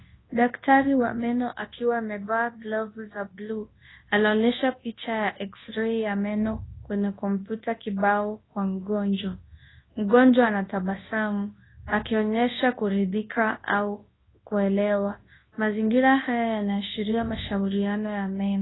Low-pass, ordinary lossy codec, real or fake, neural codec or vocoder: 7.2 kHz; AAC, 16 kbps; fake; codec, 24 kHz, 0.9 kbps, WavTokenizer, large speech release